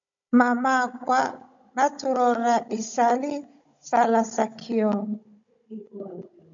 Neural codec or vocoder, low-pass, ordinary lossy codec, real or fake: codec, 16 kHz, 16 kbps, FunCodec, trained on Chinese and English, 50 frames a second; 7.2 kHz; MP3, 64 kbps; fake